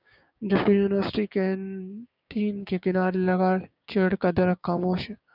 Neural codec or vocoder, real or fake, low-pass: codec, 16 kHz, 6 kbps, DAC; fake; 5.4 kHz